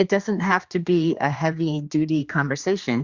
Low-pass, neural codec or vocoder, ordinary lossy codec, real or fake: 7.2 kHz; codec, 16 kHz, 2 kbps, X-Codec, HuBERT features, trained on general audio; Opus, 64 kbps; fake